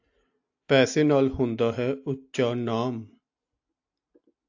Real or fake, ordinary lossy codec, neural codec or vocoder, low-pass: real; AAC, 48 kbps; none; 7.2 kHz